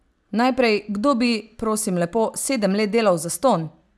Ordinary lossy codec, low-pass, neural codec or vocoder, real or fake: none; none; none; real